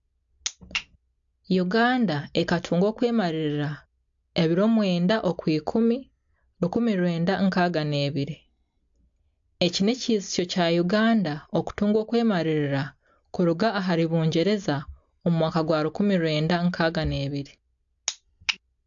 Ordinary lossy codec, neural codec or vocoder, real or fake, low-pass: AAC, 64 kbps; none; real; 7.2 kHz